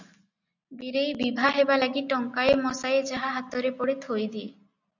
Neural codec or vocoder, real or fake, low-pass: none; real; 7.2 kHz